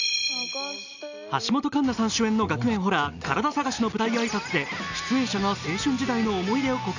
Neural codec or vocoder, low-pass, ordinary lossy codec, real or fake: none; 7.2 kHz; none; real